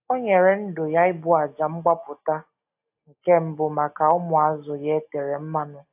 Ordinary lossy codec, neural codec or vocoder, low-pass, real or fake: AAC, 32 kbps; none; 3.6 kHz; real